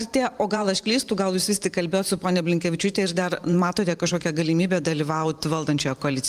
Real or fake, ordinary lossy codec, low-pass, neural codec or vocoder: fake; Opus, 32 kbps; 14.4 kHz; vocoder, 44.1 kHz, 128 mel bands every 512 samples, BigVGAN v2